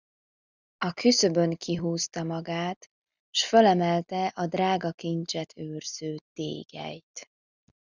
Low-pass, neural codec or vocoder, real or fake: 7.2 kHz; none; real